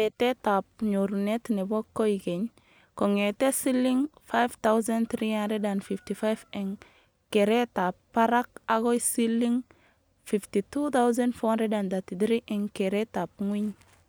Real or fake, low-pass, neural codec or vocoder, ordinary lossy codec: real; none; none; none